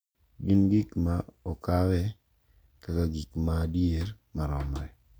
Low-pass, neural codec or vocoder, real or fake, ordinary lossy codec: none; none; real; none